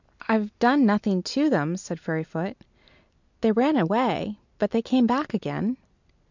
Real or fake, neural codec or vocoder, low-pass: real; none; 7.2 kHz